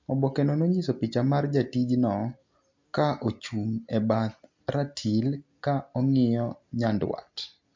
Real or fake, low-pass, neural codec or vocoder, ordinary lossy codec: real; 7.2 kHz; none; MP3, 48 kbps